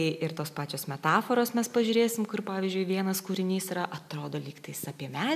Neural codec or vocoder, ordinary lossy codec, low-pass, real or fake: none; MP3, 96 kbps; 14.4 kHz; real